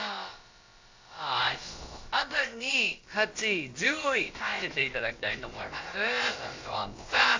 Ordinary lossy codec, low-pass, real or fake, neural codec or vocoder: AAC, 48 kbps; 7.2 kHz; fake; codec, 16 kHz, about 1 kbps, DyCAST, with the encoder's durations